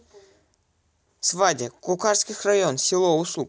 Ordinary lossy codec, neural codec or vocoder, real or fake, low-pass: none; none; real; none